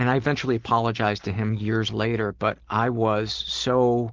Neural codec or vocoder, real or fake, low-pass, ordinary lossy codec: none; real; 7.2 kHz; Opus, 16 kbps